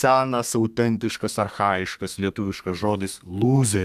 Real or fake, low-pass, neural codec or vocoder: fake; 14.4 kHz; codec, 32 kHz, 1.9 kbps, SNAC